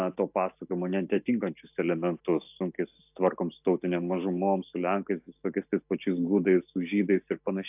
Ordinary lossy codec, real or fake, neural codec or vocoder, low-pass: AAC, 32 kbps; real; none; 3.6 kHz